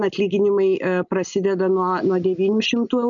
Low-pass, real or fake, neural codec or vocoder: 7.2 kHz; real; none